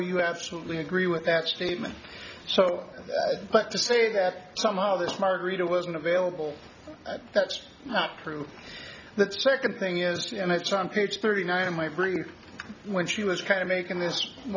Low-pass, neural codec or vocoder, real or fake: 7.2 kHz; none; real